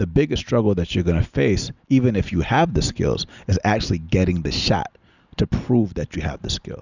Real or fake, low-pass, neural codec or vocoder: real; 7.2 kHz; none